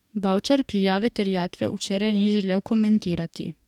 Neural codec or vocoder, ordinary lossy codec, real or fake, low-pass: codec, 44.1 kHz, 2.6 kbps, DAC; none; fake; 19.8 kHz